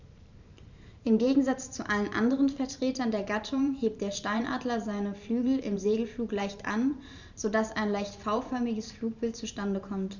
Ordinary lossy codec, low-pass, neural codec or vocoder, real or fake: none; 7.2 kHz; vocoder, 44.1 kHz, 128 mel bands every 512 samples, BigVGAN v2; fake